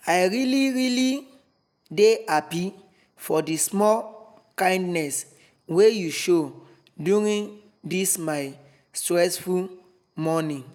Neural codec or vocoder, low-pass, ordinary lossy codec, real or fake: none; 19.8 kHz; none; real